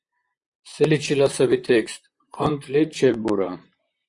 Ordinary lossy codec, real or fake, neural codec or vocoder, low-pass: Opus, 64 kbps; fake; vocoder, 44.1 kHz, 128 mel bands, Pupu-Vocoder; 10.8 kHz